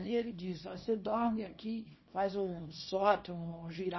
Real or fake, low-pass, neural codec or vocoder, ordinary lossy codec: fake; 7.2 kHz; codec, 24 kHz, 0.9 kbps, WavTokenizer, small release; MP3, 24 kbps